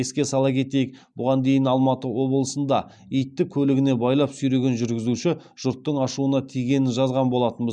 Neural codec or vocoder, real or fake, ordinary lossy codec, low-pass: none; real; none; 9.9 kHz